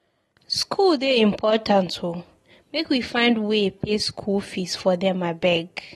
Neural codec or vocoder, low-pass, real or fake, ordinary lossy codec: none; 19.8 kHz; real; AAC, 32 kbps